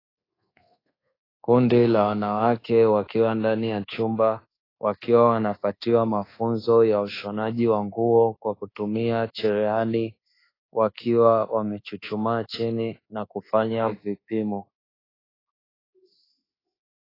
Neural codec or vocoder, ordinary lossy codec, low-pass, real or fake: codec, 24 kHz, 1.2 kbps, DualCodec; AAC, 24 kbps; 5.4 kHz; fake